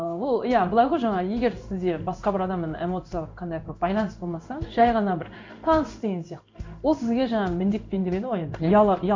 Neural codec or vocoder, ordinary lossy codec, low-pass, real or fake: codec, 16 kHz in and 24 kHz out, 1 kbps, XY-Tokenizer; MP3, 64 kbps; 7.2 kHz; fake